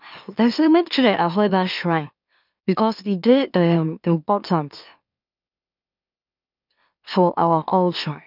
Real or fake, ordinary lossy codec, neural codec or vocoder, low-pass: fake; none; autoencoder, 44.1 kHz, a latent of 192 numbers a frame, MeloTTS; 5.4 kHz